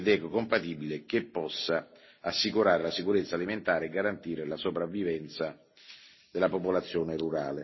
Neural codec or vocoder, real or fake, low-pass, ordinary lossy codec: none; real; 7.2 kHz; MP3, 24 kbps